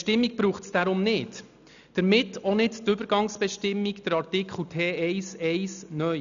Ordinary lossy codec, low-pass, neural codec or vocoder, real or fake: none; 7.2 kHz; none; real